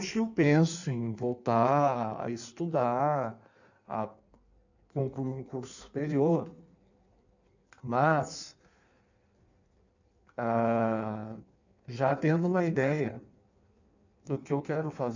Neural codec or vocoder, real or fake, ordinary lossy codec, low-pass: codec, 16 kHz in and 24 kHz out, 1.1 kbps, FireRedTTS-2 codec; fake; none; 7.2 kHz